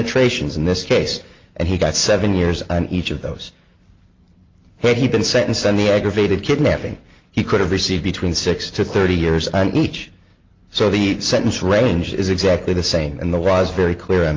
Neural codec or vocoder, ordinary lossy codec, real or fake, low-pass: none; Opus, 32 kbps; real; 7.2 kHz